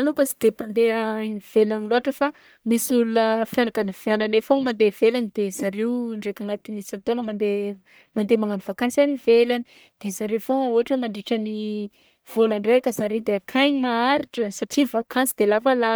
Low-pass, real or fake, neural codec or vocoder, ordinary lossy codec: none; fake; codec, 44.1 kHz, 1.7 kbps, Pupu-Codec; none